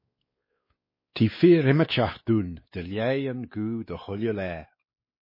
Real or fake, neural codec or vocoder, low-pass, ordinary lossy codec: fake; codec, 16 kHz, 4 kbps, X-Codec, WavLM features, trained on Multilingual LibriSpeech; 5.4 kHz; MP3, 24 kbps